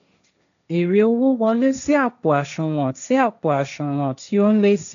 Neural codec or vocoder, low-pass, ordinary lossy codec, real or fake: codec, 16 kHz, 1.1 kbps, Voila-Tokenizer; 7.2 kHz; none; fake